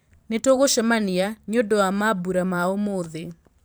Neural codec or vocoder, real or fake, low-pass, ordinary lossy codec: vocoder, 44.1 kHz, 128 mel bands every 512 samples, BigVGAN v2; fake; none; none